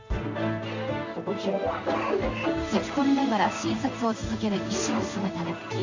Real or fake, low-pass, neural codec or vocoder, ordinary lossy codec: fake; 7.2 kHz; codec, 16 kHz in and 24 kHz out, 1 kbps, XY-Tokenizer; AAC, 48 kbps